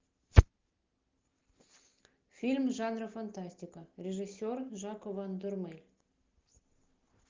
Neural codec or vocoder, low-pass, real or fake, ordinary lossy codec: none; 7.2 kHz; real; Opus, 24 kbps